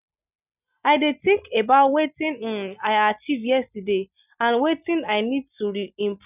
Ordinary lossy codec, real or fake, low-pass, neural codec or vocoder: none; real; 3.6 kHz; none